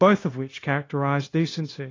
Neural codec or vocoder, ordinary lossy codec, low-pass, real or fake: codec, 16 kHz, 0.9 kbps, LongCat-Audio-Codec; AAC, 32 kbps; 7.2 kHz; fake